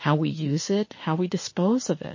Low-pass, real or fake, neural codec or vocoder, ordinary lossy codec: 7.2 kHz; fake; autoencoder, 48 kHz, 32 numbers a frame, DAC-VAE, trained on Japanese speech; MP3, 32 kbps